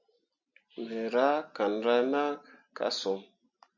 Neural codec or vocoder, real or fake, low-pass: none; real; 7.2 kHz